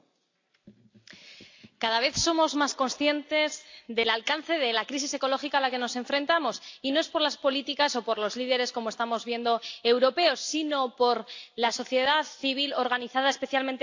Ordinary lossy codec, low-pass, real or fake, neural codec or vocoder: AAC, 48 kbps; 7.2 kHz; real; none